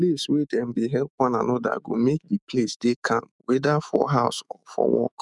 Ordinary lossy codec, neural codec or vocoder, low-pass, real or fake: none; autoencoder, 48 kHz, 128 numbers a frame, DAC-VAE, trained on Japanese speech; 10.8 kHz; fake